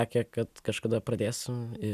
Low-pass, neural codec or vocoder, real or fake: 14.4 kHz; none; real